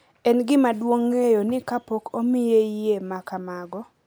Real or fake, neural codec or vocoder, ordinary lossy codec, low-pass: real; none; none; none